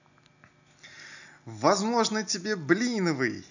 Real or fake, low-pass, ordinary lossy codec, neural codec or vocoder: real; 7.2 kHz; none; none